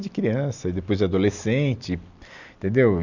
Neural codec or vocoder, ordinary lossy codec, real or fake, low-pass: none; none; real; 7.2 kHz